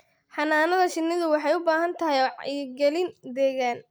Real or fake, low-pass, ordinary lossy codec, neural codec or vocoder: real; none; none; none